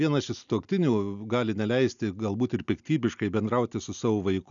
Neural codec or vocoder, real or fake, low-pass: none; real; 7.2 kHz